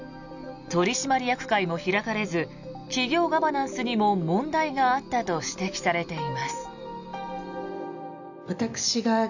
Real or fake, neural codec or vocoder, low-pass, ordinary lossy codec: real; none; 7.2 kHz; none